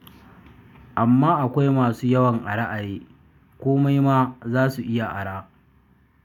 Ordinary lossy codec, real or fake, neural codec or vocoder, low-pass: none; real; none; 19.8 kHz